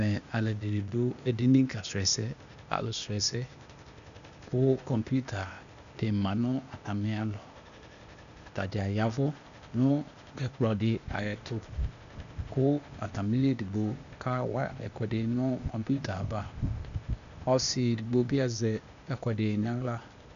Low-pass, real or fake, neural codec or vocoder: 7.2 kHz; fake; codec, 16 kHz, 0.8 kbps, ZipCodec